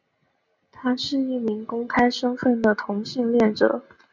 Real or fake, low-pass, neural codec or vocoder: real; 7.2 kHz; none